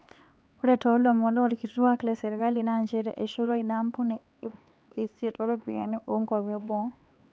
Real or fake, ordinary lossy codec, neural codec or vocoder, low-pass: fake; none; codec, 16 kHz, 4 kbps, X-Codec, HuBERT features, trained on LibriSpeech; none